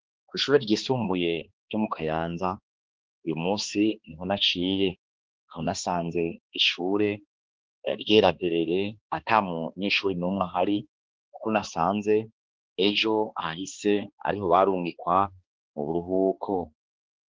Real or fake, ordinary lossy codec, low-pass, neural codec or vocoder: fake; Opus, 16 kbps; 7.2 kHz; codec, 16 kHz, 2 kbps, X-Codec, HuBERT features, trained on balanced general audio